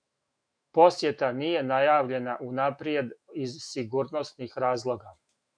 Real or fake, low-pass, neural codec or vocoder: fake; 9.9 kHz; autoencoder, 48 kHz, 128 numbers a frame, DAC-VAE, trained on Japanese speech